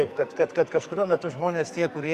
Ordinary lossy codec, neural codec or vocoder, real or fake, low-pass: Opus, 64 kbps; codec, 44.1 kHz, 2.6 kbps, SNAC; fake; 14.4 kHz